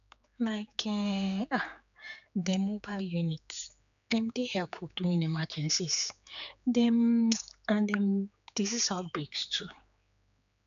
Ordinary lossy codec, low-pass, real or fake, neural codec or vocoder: none; 7.2 kHz; fake; codec, 16 kHz, 4 kbps, X-Codec, HuBERT features, trained on general audio